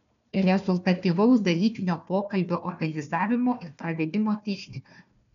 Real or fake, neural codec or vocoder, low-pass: fake; codec, 16 kHz, 1 kbps, FunCodec, trained on Chinese and English, 50 frames a second; 7.2 kHz